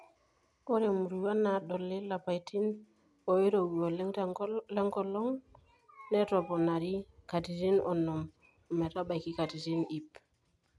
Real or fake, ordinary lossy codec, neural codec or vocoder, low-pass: real; none; none; none